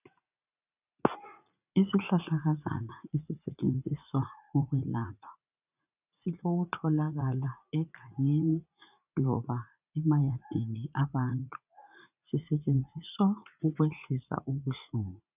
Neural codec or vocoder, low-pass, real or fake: vocoder, 44.1 kHz, 80 mel bands, Vocos; 3.6 kHz; fake